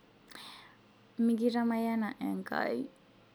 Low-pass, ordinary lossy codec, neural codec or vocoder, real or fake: none; none; none; real